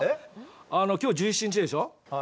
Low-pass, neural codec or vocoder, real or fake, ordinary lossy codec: none; none; real; none